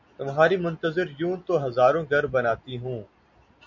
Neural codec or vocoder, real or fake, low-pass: none; real; 7.2 kHz